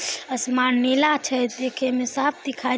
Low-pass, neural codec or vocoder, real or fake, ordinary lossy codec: none; none; real; none